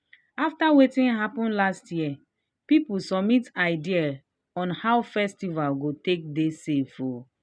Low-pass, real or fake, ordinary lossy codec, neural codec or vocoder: 9.9 kHz; real; none; none